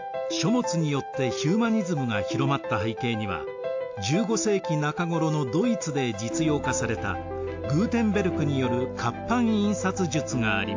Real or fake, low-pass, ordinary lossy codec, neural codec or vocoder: real; 7.2 kHz; AAC, 48 kbps; none